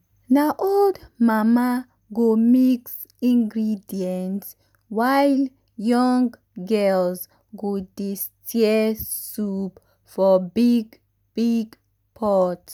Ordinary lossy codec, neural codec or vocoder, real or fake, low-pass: none; none; real; none